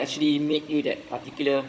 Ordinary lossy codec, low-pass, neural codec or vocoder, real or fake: none; none; codec, 16 kHz, 16 kbps, FunCodec, trained on Chinese and English, 50 frames a second; fake